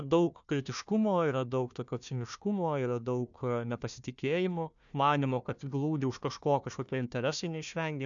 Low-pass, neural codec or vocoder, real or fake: 7.2 kHz; codec, 16 kHz, 1 kbps, FunCodec, trained on Chinese and English, 50 frames a second; fake